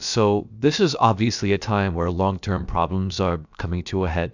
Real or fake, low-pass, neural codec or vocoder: fake; 7.2 kHz; codec, 16 kHz, about 1 kbps, DyCAST, with the encoder's durations